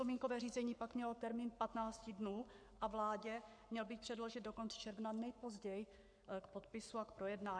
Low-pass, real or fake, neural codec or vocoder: 9.9 kHz; fake; codec, 44.1 kHz, 7.8 kbps, Pupu-Codec